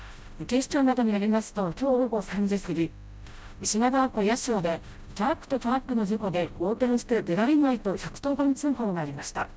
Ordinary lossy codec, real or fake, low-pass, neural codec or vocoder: none; fake; none; codec, 16 kHz, 0.5 kbps, FreqCodec, smaller model